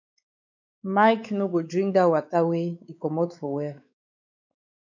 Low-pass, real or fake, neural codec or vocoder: 7.2 kHz; fake; codec, 16 kHz, 4 kbps, X-Codec, WavLM features, trained on Multilingual LibriSpeech